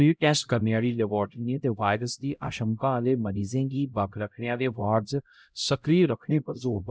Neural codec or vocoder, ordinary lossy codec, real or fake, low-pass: codec, 16 kHz, 0.5 kbps, X-Codec, HuBERT features, trained on LibriSpeech; none; fake; none